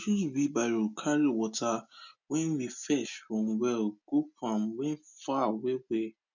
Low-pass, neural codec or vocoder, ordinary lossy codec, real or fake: 7.2 kHz; none; none; real